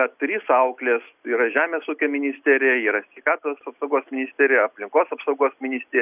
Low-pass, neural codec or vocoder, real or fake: 3.6 kHz; none; real